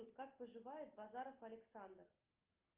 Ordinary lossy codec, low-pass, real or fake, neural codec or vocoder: Opus, 24 kbps; 3.6 kHz; real; none